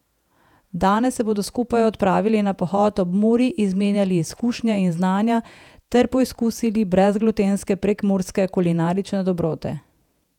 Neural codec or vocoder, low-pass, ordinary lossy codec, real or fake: vocoder, 48 kHz, 128 mel bands, Vocos; 19.8 kHz; none; fake